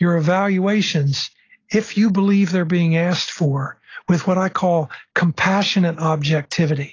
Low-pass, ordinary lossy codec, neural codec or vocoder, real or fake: 7.2 kHz; AAC, 32 kbps; none; real